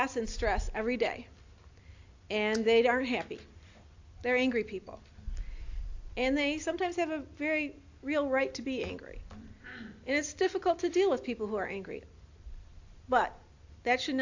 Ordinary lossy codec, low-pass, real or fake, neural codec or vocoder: AAC, 48 kbps; 7.2 kHz; real; none